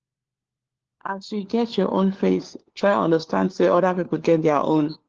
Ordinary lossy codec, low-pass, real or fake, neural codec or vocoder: Opus, 16 kbps; 7.2 kHz; fake; codec, 16 kHz, 4 kbps, FunCodec, trained on LibriTTS, 50 frames a second